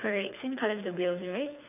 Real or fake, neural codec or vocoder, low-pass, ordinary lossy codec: fake; codec, 24 kHz, 6 kbps, HILCodec; 3.6 kHz; none